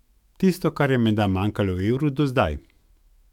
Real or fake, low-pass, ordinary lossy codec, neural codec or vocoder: fake; 19.8 kHz; MP3, 96 kbps; autoencoder, 48 kHz, 128 numbers a frame, DAC-VAE, trained on Japanese speech